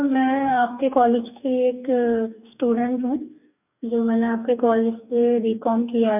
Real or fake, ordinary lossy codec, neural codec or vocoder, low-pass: fake; none; codec, 44.1 kHz, 2.6 kbps, DAC; 3.6 kHz